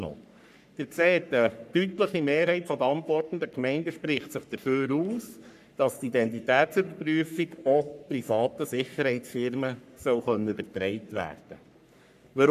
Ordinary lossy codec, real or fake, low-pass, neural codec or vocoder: none; fake; 14.4 kHz; codec, 44.1 kHz, 3.4 kbps, Pupu-Codec